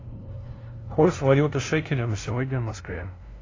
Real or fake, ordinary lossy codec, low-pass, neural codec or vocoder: fake; AAC, 32 kbps; 7.2 kHz; codec, 16 kHz, 0.5 kbps, FunCodec, trained on LibriTTS, 25 frames a second